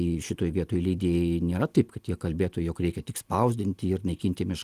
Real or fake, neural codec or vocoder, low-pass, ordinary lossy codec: real; none; 14.4 kHz; Opus, 24 kbps